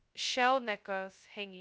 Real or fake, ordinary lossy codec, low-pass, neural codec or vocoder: fake; none; none; codec, 16 kHz, 0.2 kbps, FocalCodec